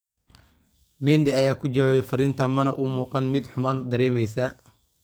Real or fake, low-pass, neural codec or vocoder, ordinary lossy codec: fake; none; codec, 44.1 kHz, 2.6 kbps, SNAC; none